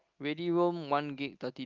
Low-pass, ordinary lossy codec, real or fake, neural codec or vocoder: 7.2 kHz; Opus, 24 kbps; real; none